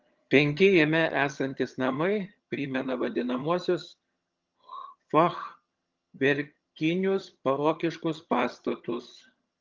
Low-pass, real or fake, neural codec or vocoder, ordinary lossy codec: 7.2 kHz; fake; vocoder, 22.05 kHz, 80 mel bands, HiFi-GAN; Opus, 32 kbps